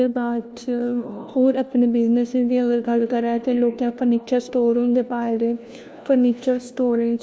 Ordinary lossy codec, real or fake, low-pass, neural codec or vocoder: none; fake; none; codec, 16 kHz, 1 kbps, FunCodec, trained on LibriTTS, 50 frames a second